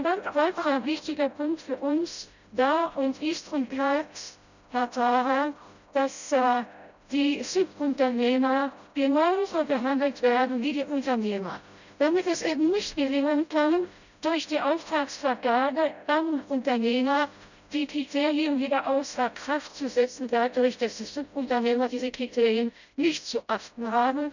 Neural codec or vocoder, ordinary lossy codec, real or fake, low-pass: codec, 16 kHz, 0.5 kbps, FreqCodec, smaller model; none; fake; 7.2 kHz